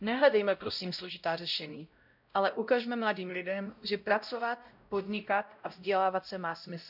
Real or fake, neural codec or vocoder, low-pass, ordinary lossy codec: fake; codec, 16 kHz, 0.5 kbps, X-Codec, WavLM features, trained on Multilingual LibriSpeech; 5.4 kHz; none